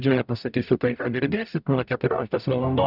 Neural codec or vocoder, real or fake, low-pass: codec, 44.1 kHz, 0.9 kbps, DAC; fake; 5.4 kHz